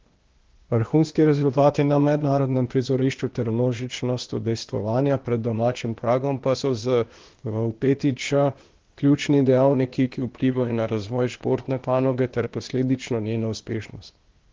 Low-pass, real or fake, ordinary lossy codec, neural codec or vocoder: 7.2 kHz; fake; Opus, 16 kbps; codec, 16 kHz, 0.8 kbps, ZipCodec